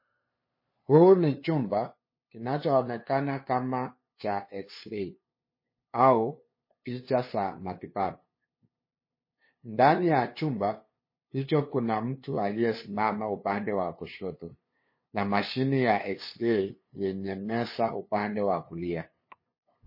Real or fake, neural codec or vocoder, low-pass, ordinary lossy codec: fake; codec, 16 kHz, 2 kbps, FunCodec, trained on LibriTTS, 25 frames a second; 5.4 kHz; MP3, 24 kbps